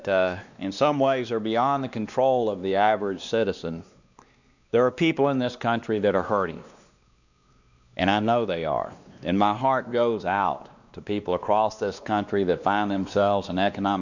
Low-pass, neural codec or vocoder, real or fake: 7.2 kHz; codec, 16 kHz, 2 kbps, X-Codec, WavLM features, trained on Multilingual LibriSpeech; fake